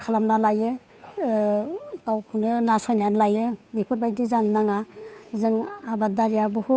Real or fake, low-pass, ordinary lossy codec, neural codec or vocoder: fake; none; none; codec, 16 kHz, 2 kbps, FunCodec, trained on Chinese and English, 25 frames a second